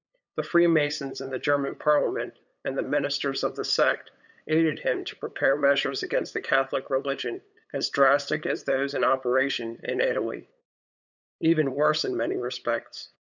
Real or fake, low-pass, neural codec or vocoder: fake; 7.2 kHz; codec, 16 kHz, 8 kbps, FunCodec, trained on LibriTTS, 25 frames a second